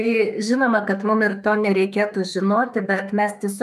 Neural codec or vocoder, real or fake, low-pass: autoencoder, 48 kHz, 32 numbers a frame, DAC-VAE, trained on Japanese speech; fake; 14.4 kHz